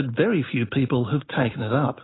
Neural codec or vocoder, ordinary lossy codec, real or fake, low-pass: none; AAC, 16 kbps; real; 7.2 kHz